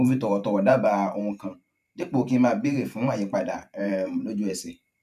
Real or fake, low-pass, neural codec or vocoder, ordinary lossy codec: fake; 14.4 kHz; vocoder, 44.1 kHz, 128 mel bands every 512 samples, BigVGAN v2; MP3, 96 kbps